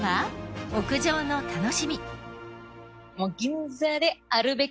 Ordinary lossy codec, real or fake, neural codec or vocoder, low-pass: none; real; none; none